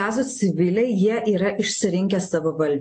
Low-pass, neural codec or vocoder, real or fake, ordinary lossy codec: 9.9 kHz; none; real; AAC, 48 kbps